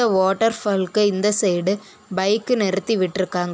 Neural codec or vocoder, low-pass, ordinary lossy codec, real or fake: none; none; none; real